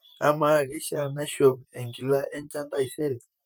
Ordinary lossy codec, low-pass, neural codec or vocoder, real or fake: none; none; vocoder, 44.1 kHz, 128 mel bands, Pupu-Vocoder; fake